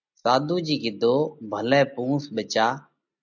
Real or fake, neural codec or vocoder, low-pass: real; none; 7.2 kHz